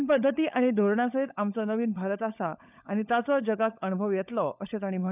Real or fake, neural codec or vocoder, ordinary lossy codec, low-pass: fake; codec, 16 kHz, 16 kbps, FunCodec, trained on LibriTTS, 50 frames a second; none; 3.6 kHz